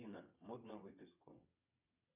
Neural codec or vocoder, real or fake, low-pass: vocoder, 22.05 kHz, 80 mel bands, WaveNeXt; fake; 3.6 kHz